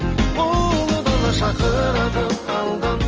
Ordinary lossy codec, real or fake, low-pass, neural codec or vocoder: Opus, 24 kbps; real; 7.2 kHz; none